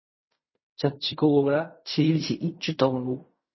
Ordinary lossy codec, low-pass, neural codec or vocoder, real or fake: MP3, 24 kbps; 7.2 kHz; codec, 16 kHz in and 24 kHz out, 0.4 kbps, LongCat-Audio-Codec, fine tuned four codebook decoder; fake